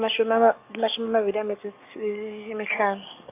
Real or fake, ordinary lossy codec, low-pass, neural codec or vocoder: fake; AAC, 24 kbps; 3.6 kHz; codec, 44.1 kHz, 7.8 kbps, DAC